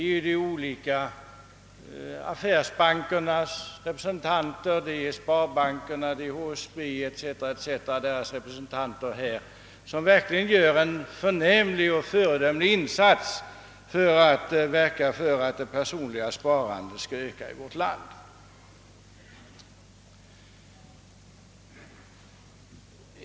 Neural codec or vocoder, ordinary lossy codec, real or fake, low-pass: none; none; real; none